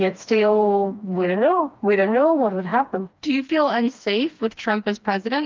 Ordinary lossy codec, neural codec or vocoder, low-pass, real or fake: Opus, 24 kbps; codec, 16 kHz, 2 kbps, FreqCodec, smaller model; 7.2 kHz; fake